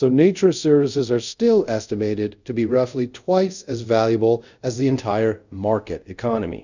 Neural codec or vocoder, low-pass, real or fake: codec, 24 kHz, 0.5 kbps, DualCodec; 7.2 kHz; fake